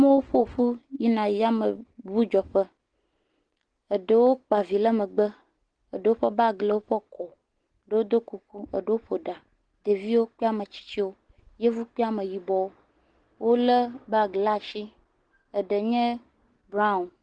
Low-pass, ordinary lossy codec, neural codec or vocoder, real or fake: 9.9 kHz; Opus, 16 kbps; none; real